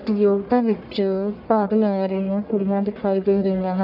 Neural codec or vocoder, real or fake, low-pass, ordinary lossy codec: codec, 44.1 kHz, 1.7 kbps, Pupu-Codec; fake; 5.4 kHz; none